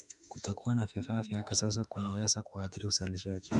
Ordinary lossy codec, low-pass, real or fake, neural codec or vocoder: none; 10.8 kHz; fake; autoencoder, 48 kHz, 32 numbers a frame, DAC-VAE, trained on Japanese speech